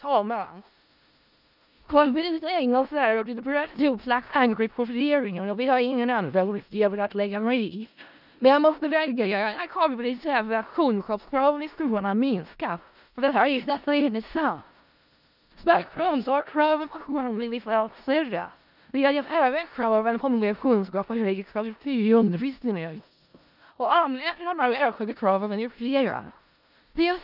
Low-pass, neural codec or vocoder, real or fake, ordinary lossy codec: 5.4 kHz; codec, 16 kHz in and 24 kHz out, 0.4 kbps, LongCat-Audio-Codec, four codebook decoder; fake; none